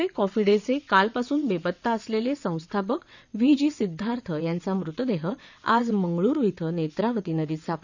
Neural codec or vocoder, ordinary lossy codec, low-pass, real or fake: vocoder, 22.05 kHz, 80 mel bands, WaveNeXt; none; 7.2 kHz; fake